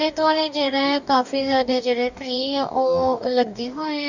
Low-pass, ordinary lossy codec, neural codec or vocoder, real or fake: 7.2 kHz; none; codec, 44.1 kHz, 2.6 kbps, DAC; fake